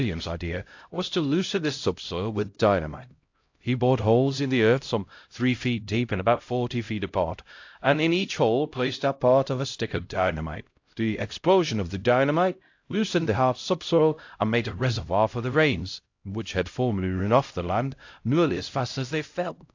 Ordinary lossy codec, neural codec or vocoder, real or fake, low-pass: AAC, 48 kbps; codec, 16 kHz, 0.5 kbps, X-Codec, HuBERT features, trained on LibriSpeech; fake; 7.2 kHz